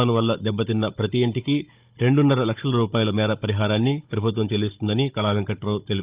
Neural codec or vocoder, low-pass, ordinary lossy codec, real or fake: codec, 16 kHz, 16 kbps, FunCodec, trained on Chinese and English, 50 frames a second; 3.6 kHz; Opus, 32 kbps; fake